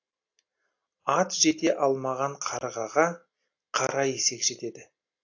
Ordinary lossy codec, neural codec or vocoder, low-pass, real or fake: AAC, 48 kbps; none; 7.2 kHz; real